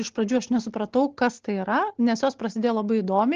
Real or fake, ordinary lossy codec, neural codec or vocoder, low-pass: real; Opus, 16 kbps; none; 7.2 kHz